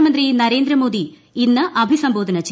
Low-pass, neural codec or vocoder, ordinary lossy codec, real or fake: none; none; none; real